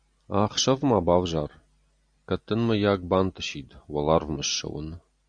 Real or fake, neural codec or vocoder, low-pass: real; none; 9.9 kHz